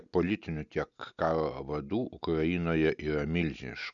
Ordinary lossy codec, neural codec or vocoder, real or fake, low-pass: MP3, 96 kbps; none; real; 7.2 kHz